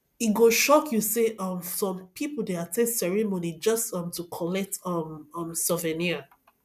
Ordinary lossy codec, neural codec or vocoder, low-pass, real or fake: none; none; 14.4 kHz; real